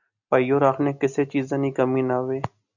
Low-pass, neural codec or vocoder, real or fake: 7.2 kHz; none; real